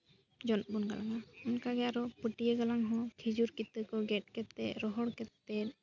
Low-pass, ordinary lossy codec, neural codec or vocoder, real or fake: 7.2 kHz; none; none; real